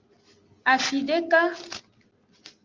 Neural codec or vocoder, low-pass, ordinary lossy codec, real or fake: none; 7.2 kHz; Opus, 32 kbps; real